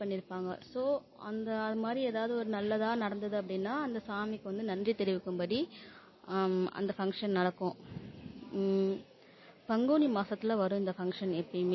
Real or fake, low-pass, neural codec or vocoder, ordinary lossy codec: real; 7.2 kHz; none; MP3, 24 kbps